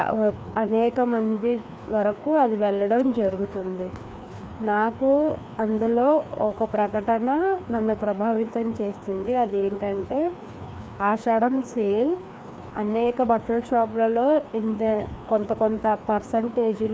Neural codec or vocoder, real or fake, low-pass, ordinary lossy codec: codec, 16 kHz, 2 kbps, FreqCodec, larger model; fake; none; none